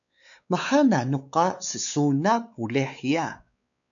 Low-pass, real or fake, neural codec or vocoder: 7.2 kHz; fake; codec, 16 kHz, 4 kbps, X-Codec, WavLM features, trained on Multilingual LibriSpeech